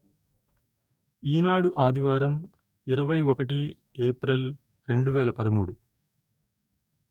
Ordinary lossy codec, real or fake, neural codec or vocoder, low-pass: none; fake; codec, 44.1 kHz, 2.6 kbps, DAC; 19.8 kHz